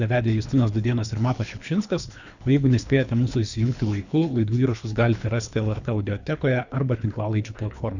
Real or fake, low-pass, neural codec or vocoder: fake; 7.2 kHz; codec, 24 kHz, 3 kbps, HILCodec